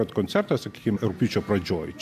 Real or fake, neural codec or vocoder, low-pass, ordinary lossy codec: real; none; 14.4 kHz; AAC, 96 kbps